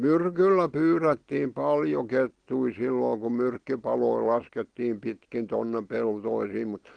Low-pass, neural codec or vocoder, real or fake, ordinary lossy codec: 9.9 kHz; none; real; Opus, 16 kbps